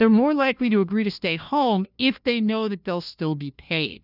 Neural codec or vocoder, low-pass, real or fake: codec, 16 kHz, 1 kbps, FunCodec, trained on LibriTTS, 50 frames a second; 5.4 kHz; fake